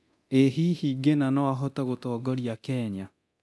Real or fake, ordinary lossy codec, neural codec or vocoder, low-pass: fake; none; codec, 24 kHz, 0.9 kbps, DualCodec; none